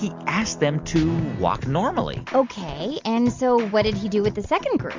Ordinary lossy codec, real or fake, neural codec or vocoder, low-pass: MP3, 64 kbps; real; none; 7.2 kHz